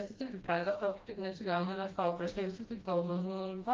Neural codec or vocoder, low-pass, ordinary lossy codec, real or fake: codec, 16 kHz, 1 kbps, FreqCodec, smaller model; 7.2 kHz; Opus, 32 kbps; fake